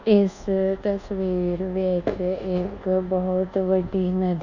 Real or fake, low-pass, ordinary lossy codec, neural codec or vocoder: fake; 7.2 kHz; none; codec, 24 kHz, 1.2 kbps, DualCodec